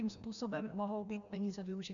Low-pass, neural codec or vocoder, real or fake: 7.2 kHz; codec, 16 kHz, 1 kbps, FreqCodec, larger model; fake